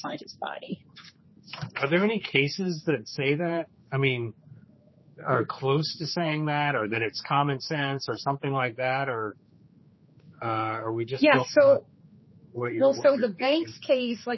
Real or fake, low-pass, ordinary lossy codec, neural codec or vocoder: fake; 7.2 kHz; MP3, 24 kbps; codec, 16 kHz, 4 kbps, X-Codec, HuBERT features, trained on general audio